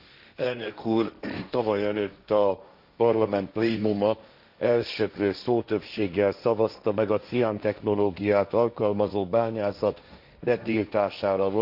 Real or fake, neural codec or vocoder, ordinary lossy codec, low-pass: fake; codec, 16 kHz, 1.1 kbps, Voila-Tokenizer; none; 5.4 kHz